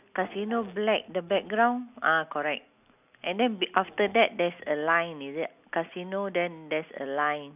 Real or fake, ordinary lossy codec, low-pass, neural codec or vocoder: real; none; 3.6 kHz; none